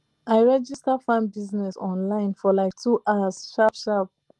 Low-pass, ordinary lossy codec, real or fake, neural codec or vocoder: 10.8 kHz; Opus, 24 kbps; real; none